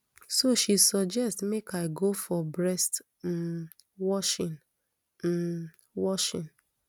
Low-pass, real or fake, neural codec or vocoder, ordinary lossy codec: none; real; none; none